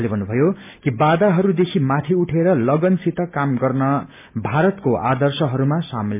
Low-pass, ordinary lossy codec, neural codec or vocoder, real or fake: 3.6 kHz; MP3, 32 kbps; none; real